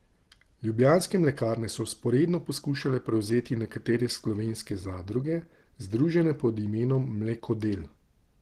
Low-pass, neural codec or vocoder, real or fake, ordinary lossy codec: 14.4 kHz; none; real; Opus, 16 kbps